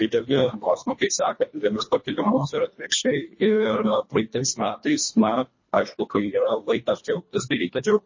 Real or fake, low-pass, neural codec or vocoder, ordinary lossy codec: fake; 7.2 kHz; codec, 24 kHz, 1.5 kbps, HILCodec; MP3, 32 kbps